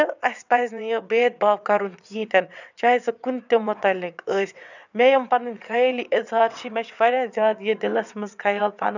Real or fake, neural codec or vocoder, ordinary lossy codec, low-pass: fake; vocoder, 22.05 kHz, 80 mel bands, WaveNeXt; none; 7.2 kHz